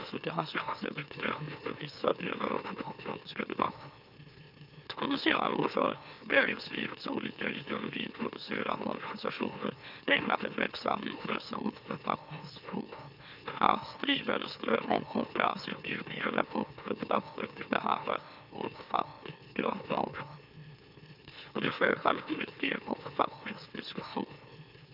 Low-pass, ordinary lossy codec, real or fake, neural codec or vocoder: 5.4 kHz; none; fake; autoencoder, 44.1 kHz, a latent of 192 numbers a frame, MeloTTS